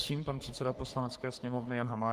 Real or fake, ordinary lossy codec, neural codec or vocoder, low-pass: fake; Opus, 24 kbps; codec, 44.1 kHz, 3.4 kbps, Pupu-Codec; 14.4 kHz